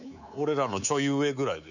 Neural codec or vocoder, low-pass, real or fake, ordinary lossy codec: codec, 24 kHz, 3.1 kbps, DualCodec; 7.2 kHz; fake; none